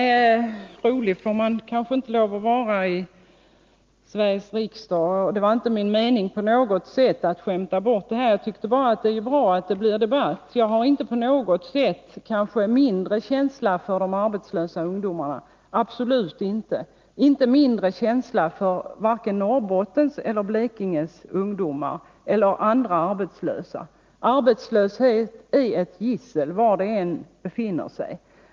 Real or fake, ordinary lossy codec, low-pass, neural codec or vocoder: real; Opus, 32 kbps; 7.2 kHz; none